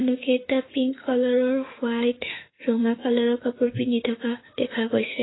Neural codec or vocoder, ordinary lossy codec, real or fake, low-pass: autoencoder, 48 kHz, 32 numbers a frame, DAC-VAE, trained on Japanese speech; AAC, 16 kbps; fake; 7.2 kHz